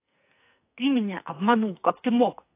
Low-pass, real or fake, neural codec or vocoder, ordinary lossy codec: 3.6 kHz; fake; codec, 44.1 kHz, 2.6 kbps, SNAC; AAC, 24 kbps